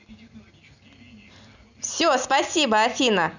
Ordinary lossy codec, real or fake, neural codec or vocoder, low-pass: none; real; none; 7.2 kHz